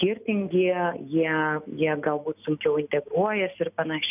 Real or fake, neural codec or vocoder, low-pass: real; none; 3.6 kHz